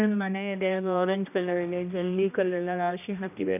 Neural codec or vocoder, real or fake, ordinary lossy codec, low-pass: codec, 16 kHz, 1 kbps, X-Codec, HuBERT features, trained on general audio; fake; none; 3.6 kHz